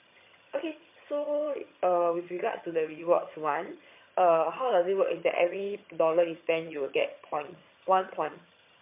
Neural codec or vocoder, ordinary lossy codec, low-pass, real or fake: vocoder, 22.05 kHz, 80 mel bands, HiFi-GAN; MP3, 24 kbps; 3.6 kHz; fake